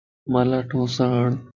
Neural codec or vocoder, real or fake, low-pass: vocoder, 44.1 kHz, 128 mel bands every 512 samples, BigVGAN v2; fake; 7.2 kHz